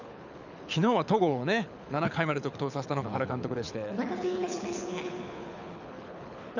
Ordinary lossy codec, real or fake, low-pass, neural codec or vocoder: none; fake; 7.2 kHz; codec, 24 kHz, 6 kbps, HILCodec